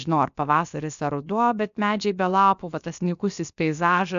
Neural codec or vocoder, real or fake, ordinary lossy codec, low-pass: codec, 16 kHz, about 1 kbps, DyCAST, with the encoder's durations; fake; AAC, 96 kbps; 7.2 kHz